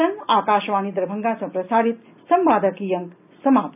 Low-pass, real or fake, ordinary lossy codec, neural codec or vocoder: 3.6 kHz; real; none; none